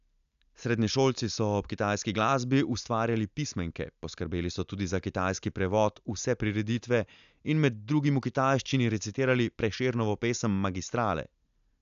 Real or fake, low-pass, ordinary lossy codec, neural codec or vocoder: real; 7.2 kHz; AAC, 96 kbps; none